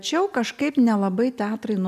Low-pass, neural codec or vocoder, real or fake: 14.4 kHz; none; real